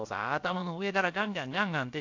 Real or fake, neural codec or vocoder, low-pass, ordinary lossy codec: fake; codec, 16 kHz, about 1 kbps, DyCAST, with the encoder's durations; 7.2 kHz; AAC, 48 kbps